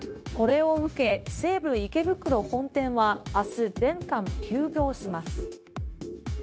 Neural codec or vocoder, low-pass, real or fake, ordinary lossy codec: codec, 16 kHz, 0.9 kbps, LongCat-Audio-Codec; none; fake; none